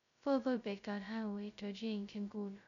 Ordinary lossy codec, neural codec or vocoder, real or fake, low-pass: none; codec, 16 kHz, 0.2 kbps, FocalCodec; fake; 7.2 kHz